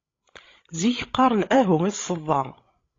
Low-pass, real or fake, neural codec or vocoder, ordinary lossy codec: 7.2 kHz; fake; codec, 16 kHz, 16 kbps, FreqCodec, larger model; AAC, 32 kbps